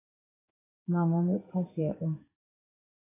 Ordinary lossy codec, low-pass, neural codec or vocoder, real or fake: AAC, 16 kbps; 3.6 kHz; codec, 16 kHz in and 24 kHz out, 1 kbps, XY-Tokenizer; fake